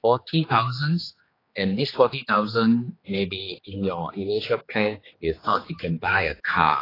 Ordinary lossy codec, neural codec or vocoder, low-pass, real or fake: AAC, 24 kbps; codec, 16 kHz, 2 kbps, X-Codec, HuBERT features, trained on general audio; 5.4 kHz; fake